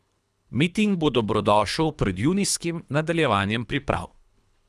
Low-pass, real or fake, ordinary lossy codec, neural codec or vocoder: none; fake; none; codec, 24 kHz, 3 kbps, HILCodec